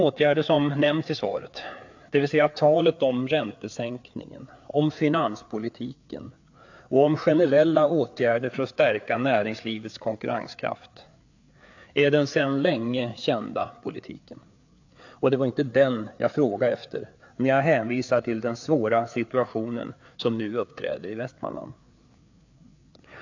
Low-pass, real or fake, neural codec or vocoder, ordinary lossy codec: 7.2 kHz; fake; codec, 16 kHz, 4 kbps, FreqCodec, larger model; AAC, 48 kbps